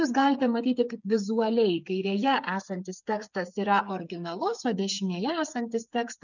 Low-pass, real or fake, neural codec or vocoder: 7.2 kHz; fake; codec, 16 kHz, 8 kbps, FreqCodec, smaller model